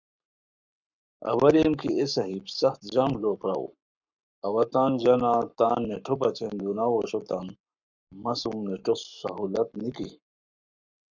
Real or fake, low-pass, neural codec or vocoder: fake; 7.2 kHz; codec, 44.1 kHz, 7.8 kbps, Pupu-Codec